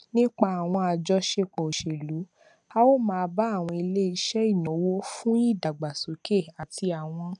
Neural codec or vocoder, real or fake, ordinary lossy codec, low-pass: none; real; none; 9.9 kHz